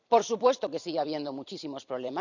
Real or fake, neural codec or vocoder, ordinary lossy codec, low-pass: fake; vocoder, 44.1 kHz, 128 mel bands every 256 samples, BigVGAN v2; none; 7.2 kHz